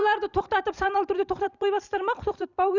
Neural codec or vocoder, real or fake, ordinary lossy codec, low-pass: vocoder, 44.1 kHz, 128 mel bands every 256 samples, BigVGAN v2; fake; none; 7.2 kHz